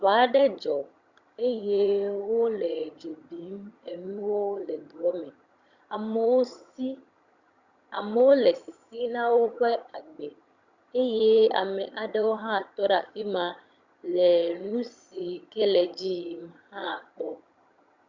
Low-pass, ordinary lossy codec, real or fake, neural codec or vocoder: 7.2 kHz; Opus, 64 kbps; fake; vocoder, 22.05 kHz, 80 mel bands, HiFi-GAN